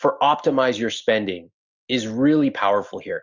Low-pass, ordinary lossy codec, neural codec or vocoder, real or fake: 7.2 kHz; Opus, 64 kbps; none; real